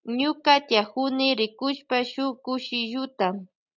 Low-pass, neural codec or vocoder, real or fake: 7.2 kHz; none; real